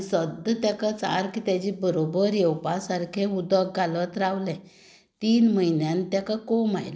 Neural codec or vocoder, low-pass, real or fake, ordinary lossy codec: none; none; real; none